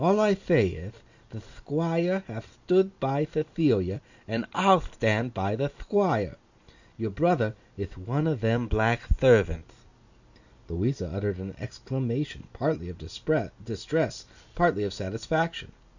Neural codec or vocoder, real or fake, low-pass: none; real; 7.2 kHz